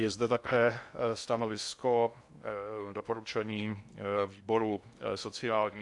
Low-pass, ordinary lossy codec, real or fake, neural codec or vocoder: 10.8 kHz; AAC, 64 kbps; fake; codec, 16 kHz in and 24 kHz out, 0.6 kbps, FocalCodec, streaming, 2048 codes